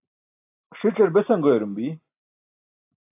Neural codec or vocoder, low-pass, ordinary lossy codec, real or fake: none; 3.6 kHz; AAC, 32 kbps; real